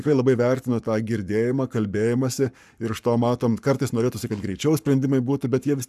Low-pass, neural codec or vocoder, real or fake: 14.4 kHz; codec, 44.1 kHz, 7.8 kbps, Pupu-Codec; fake